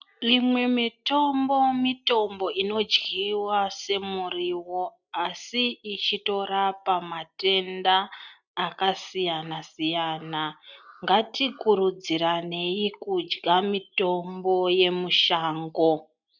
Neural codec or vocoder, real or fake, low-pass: none; real; 7.2 kHz